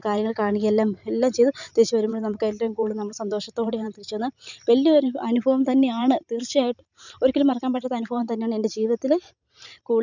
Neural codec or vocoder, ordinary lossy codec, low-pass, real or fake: vocoder, 44.1 kHz, 128 mel bands every 512 samples, BigVGAN v2; none; 7.2 kHz; fake